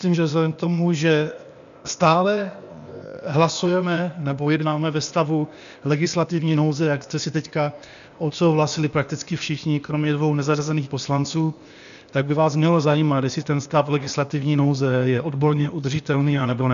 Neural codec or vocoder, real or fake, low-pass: codec, 16 kHz, 0.8 kbps, ZipCodec; fake; 7.2 kHz